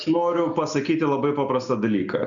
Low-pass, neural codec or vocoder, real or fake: 7.2 kHz; none; real